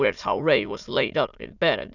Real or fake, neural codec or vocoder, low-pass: fake; autoencoder, 22.05 kHz, a latent of 192 numbers a frame, VITS, trained on many speakers; 7.2 kHz